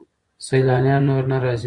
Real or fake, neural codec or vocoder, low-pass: fake; vocoder, 24 kHz, 100 mel bands, Vocos; 10.8 kHz